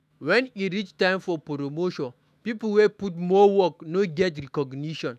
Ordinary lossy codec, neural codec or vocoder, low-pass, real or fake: none; autoencoder, 48 kHz, 128 numbers a frame, DAC-VAE, trained on Japanese speech; 14.4 kHz; fake